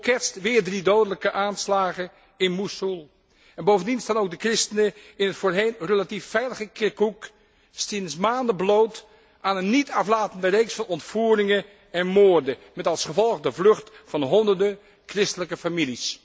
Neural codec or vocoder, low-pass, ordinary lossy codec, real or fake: none; none; none; real